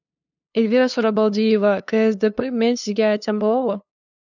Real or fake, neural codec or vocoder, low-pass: fake; codec, 16 kHz, 2 kbps, FunCodec, trained on LibriTTS, 25 frames a second; 7.2 kHz